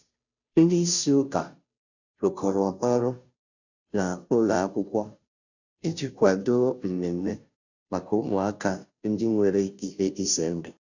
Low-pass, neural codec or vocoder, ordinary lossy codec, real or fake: 7.2 kHz; codec, 16 kHz, 0.5 kbps, FunCodec, trained on Chinese and English, 25 frames a second; none; fake